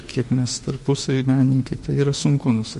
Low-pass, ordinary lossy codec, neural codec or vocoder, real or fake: 14.4 kHz; MP3, 48 kbps; autoencoder, 48 kHz, 32 numbers a frame, DAC-VAE, trained on Japanese speech; fake